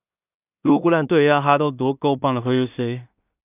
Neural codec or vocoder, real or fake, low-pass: codec, 16 kHz in and 24 kHz out, 0.4 kbps, LongCat-Audio-Codec, two codebook decoder; fake; 3.6 kHz